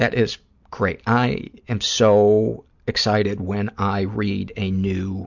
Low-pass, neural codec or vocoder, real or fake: 7.2 kHz; none; real